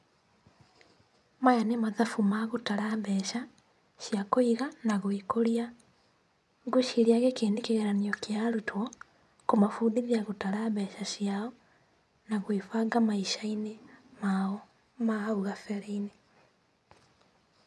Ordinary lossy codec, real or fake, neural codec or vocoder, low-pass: none; fake; vocoder, 24 kHz, 100 mel bands, Vocos; none